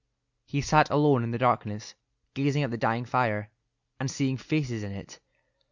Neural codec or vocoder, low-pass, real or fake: none; 7.2 kHz; real